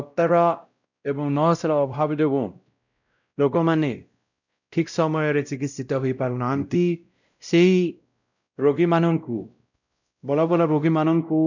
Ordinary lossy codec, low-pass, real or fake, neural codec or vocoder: none; 7.2 kHz; fake; codec, 16 kHz, 0.5 kbps, X-Codec, WavLM features, trained on Multilingual LibriSpeech